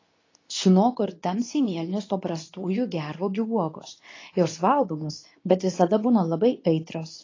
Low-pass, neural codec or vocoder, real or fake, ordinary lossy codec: 7.2 kHz; codec, 24 kHz, 0.9 kbps, WavTokenizer, medium speech release version 2; fake; AAC, 32 kbps